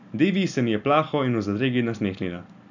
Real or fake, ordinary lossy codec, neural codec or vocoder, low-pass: real; none; none; 7.2 kHz